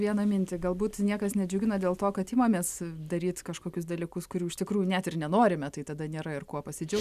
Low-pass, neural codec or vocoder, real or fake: 14.4 kHz; none; real